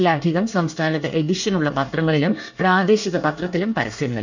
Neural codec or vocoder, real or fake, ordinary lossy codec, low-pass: codec, 24 kHz, 1 kbps, SNAC; fake; none; 7.2 kHz